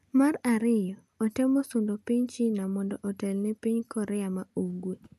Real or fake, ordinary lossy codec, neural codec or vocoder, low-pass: real; none; none; 14.4 kHz